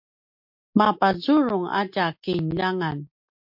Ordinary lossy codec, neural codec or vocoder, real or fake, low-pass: MP3, 48 kbps; none; real; 5.4 kHz